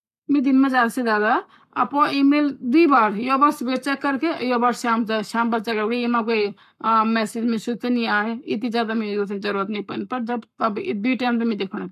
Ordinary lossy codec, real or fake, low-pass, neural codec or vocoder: none; fake; 14.4 kHz; codec, 44.1 kHz, 7.8 kbps, Pupu-Codec